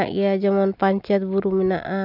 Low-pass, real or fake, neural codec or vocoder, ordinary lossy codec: 5.4 kHz; real; none; none